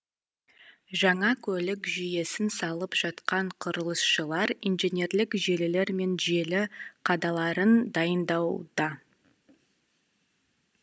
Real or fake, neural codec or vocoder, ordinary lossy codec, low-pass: real; none; none; none